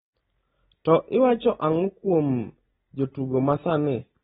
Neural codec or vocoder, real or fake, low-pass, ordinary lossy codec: none; real; 14.4 kHz; AAC, 16 kbps